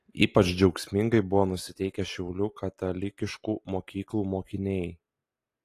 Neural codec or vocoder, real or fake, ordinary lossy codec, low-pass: none; real; AAC, 48 kbps; 14.4 kHz